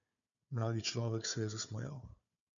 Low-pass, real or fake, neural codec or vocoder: 7.2 kHz; fake; codec, 16 kHz, 4 kbps, FunCodec, trained on Chinese and English, 50 frames a second